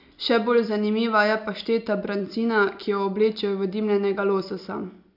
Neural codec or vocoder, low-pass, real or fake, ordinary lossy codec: none; 5.4 kHz; real; none